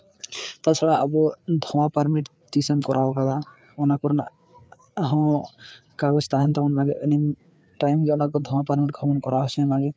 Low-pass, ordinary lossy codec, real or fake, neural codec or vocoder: none; none; fake; codec, 16 kHz, 4 kbps, FreqCodec, larger model